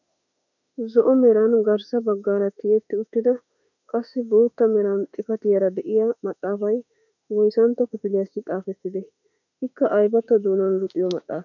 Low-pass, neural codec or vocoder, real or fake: 7.2 kHz; autoencoder, 48 kHz, 32 numbers a frame, DAC-VAE, trained on Japanese speech; fake